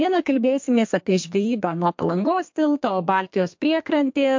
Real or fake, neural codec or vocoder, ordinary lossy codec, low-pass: fake; codec, 32 kHz, 1.9 kbps, SNAC; MP3, 48 kbps; 7.2 kHz